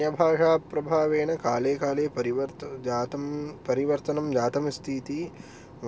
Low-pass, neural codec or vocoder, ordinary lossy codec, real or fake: none; none; none; real